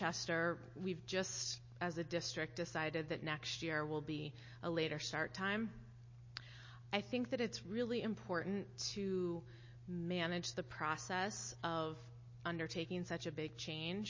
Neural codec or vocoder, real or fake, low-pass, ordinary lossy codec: none; real; 7.2 kHz; MP3, 32 kbps